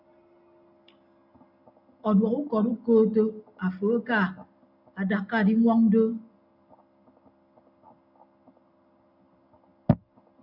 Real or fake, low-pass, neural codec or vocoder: real; 5.4 kHz; none